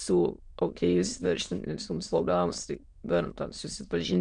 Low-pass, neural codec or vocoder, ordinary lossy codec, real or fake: 9.9 kHz; autoencoder, 22.05 kHz, a latent of 192 numbers a frame, VITS, trained on many speakers; MP3, 64 kbps; fake